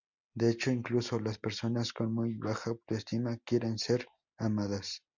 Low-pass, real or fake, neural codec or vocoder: 7.2 kHz; real; none